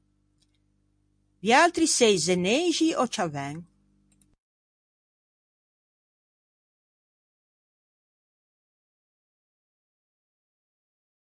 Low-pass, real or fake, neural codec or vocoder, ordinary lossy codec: 9.9 kHz; real; none; MP3, 64 kbps